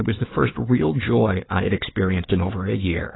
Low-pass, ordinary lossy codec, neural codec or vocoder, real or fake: 7.2 kHz; AAC, 16 kbps; codec, 16 kHz, 4 kbps, FunCodec, trained on Chinese and English, 50 frames a second; fake